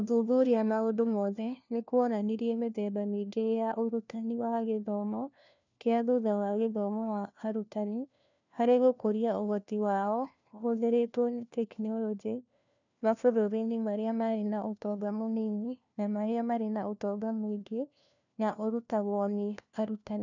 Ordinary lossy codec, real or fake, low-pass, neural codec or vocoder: none; fake; 7.2 kHz; codec, 16 kHz, 1 kbps, FunCodec, trained on LibriTTS, 50 frames a second